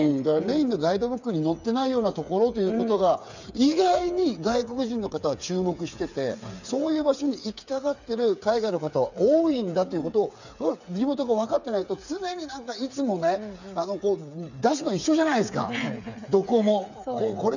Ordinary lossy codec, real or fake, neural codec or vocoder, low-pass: none; fake; codec, 16 kHz, 8 kbps, FreqCodec, smaller model; 7.2 kHz